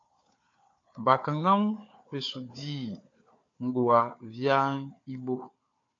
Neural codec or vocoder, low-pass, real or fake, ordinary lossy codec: codec, 16 kHz, 4 kbps, FunCodec, trained on Chinese and English, 50 frames a second; 7.2 kHz; fake; AAC, 48 kbps